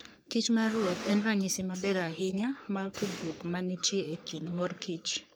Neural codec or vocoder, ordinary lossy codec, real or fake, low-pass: codec, 44.1 kHz, 3.4 kbps, Pupu-Codec; none; fake; none